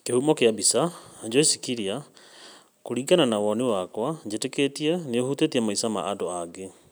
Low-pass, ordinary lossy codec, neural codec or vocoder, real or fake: none; none; none; real